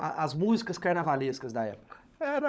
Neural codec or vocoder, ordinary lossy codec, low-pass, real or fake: codec, 16 kHz, 16 kbps, FunCodec, trained on LibriTTS, 50 frames a second; none; none; fake